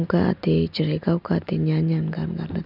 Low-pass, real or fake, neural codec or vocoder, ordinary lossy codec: 5.4 kHz; real; none; none